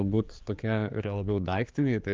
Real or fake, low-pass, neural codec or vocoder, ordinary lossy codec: fake; 7.2 kHz; codec, 16 kHz, 4 kbps, X-Codec, HuBERT features, trained on general audio; Opus, 24 kbps